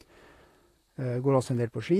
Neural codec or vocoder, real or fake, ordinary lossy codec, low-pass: vocoder, 44.1 kHz, 128 mel bands, Pupu-Vocoder; fake; AAC, 48 kbps; 14.4 kHz